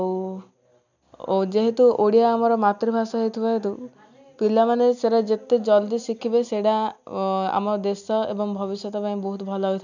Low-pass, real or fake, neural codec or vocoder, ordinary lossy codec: 7.2 kHz; real; none; none